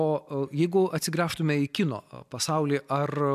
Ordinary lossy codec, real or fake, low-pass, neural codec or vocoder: MP3, 96 kbps; real; 14.4 kHz; none